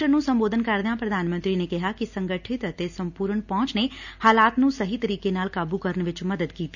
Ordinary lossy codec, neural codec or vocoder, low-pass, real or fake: none; none; 7.2 kHz; real